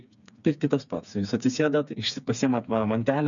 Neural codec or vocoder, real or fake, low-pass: codec, 16 kHz, 4 kbps, FreqCodec, smaller model; fake; 7.2 kHz